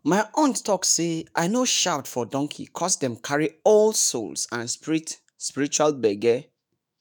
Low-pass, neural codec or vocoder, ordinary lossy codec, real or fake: none; autoencoder, 48 kHz, 128 numbers a frame, DAC-VAE, trained on Japanese speech; none; fake